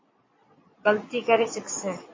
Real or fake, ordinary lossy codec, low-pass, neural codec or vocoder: fake; MP3, 32 kbps; 7.2 kHz; vocoder, 22.05 kHz, 80 mel bands, Vocos